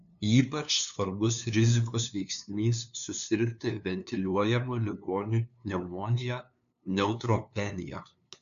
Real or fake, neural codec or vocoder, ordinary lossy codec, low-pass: fake; codec, 16 kHz, 2 kbps, FunCodec, trained on LibriTTS, 25 frames a second; MP3, 96 kbps; 7.2 kHz